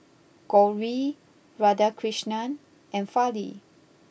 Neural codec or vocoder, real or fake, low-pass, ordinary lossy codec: none; real; none; none